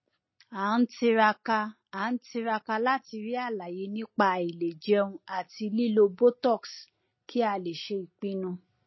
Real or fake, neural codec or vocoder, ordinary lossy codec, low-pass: real; none; MP3, 24 kbps; 7.2 kHz